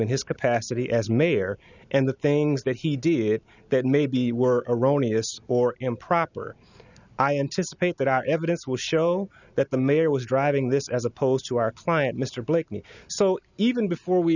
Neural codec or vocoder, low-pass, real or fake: none; 7.2 kHz; real